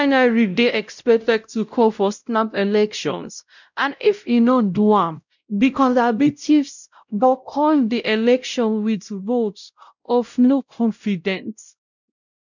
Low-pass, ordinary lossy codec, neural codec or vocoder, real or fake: 7.2 kHz; none; codec, 16 kHz, 0.5 kbps, X-Codec, WavLM features, trained on Multilingual LibriSpeech; fake